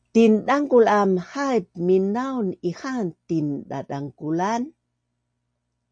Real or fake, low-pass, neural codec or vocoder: real; 9.9 kHz; none